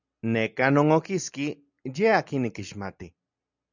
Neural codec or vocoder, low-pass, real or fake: none; 7.2 kHz; real